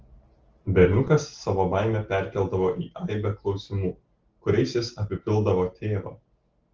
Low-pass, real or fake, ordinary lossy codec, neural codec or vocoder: 7.2 kHz; real; Opus, 16 kbps; none